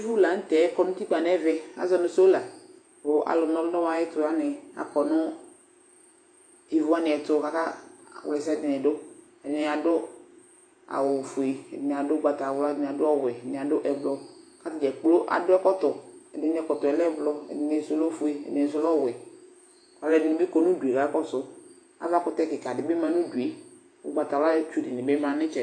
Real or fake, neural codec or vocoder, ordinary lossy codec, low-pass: fake; vocoder, 48 kHz, 128 mel bands, Vocos; AAC, 48 kbps; 9.9 kHz